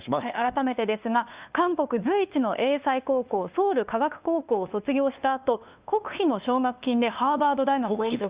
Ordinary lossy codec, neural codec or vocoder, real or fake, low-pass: Opus, 64 kbps; codec, 16 kHz, 2 kbps, FunCodec, trained on LibriTTS, 25 frames a second; fake; 3.6 kHz